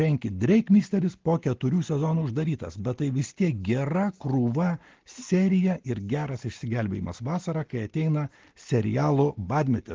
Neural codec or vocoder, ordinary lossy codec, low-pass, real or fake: none; Opus, 16 kbps; 7.2 kHz; real